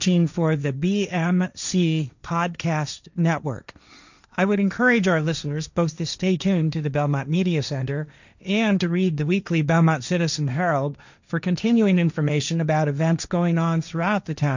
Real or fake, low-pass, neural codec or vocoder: fake; 7.2 kHz; codec, 16 kHz, 1.1 kbps, Voila-Tokenizer